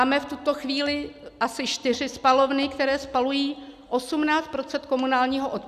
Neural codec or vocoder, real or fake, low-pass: none; real; 14.4 kHz